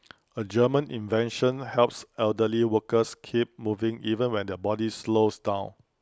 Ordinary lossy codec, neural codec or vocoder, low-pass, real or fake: none; none; none; real